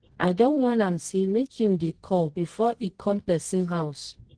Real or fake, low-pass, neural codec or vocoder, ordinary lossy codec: fake; 9.9 kHz; codec, 24 kHz, 0.9 kbps, WavTokenizer, medium music audio release; Opus, 16 kbps